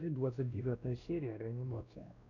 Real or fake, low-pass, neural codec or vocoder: fake; 7.2 kHz; codec, 16 kHz, 1 kbps, X-Codec, WavLM features, trained on Multilingual LibriSpeech